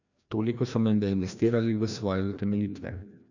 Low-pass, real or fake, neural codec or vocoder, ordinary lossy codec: 7.2 kHz; fake; codec, 16 kHz, 1 kbps, FreqCodec, larger model; none